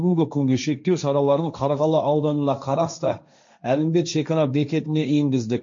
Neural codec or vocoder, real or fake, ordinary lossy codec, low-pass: codec, 16 kHz, 1.1 kbps, Voila-Tokenizer; fake; MP3, 48 kbps; 7.2 kHz